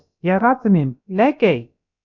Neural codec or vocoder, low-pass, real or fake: codec, 16 kHz, about 1 kbps, DyCAST, with the encoder's durations; 7.2 kHz; fake